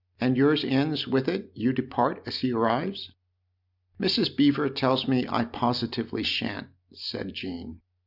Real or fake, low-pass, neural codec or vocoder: real; 5.4 kHz; none